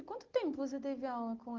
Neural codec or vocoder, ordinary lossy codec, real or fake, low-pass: none; Opus, 16 kbps; real; 7.2 kHz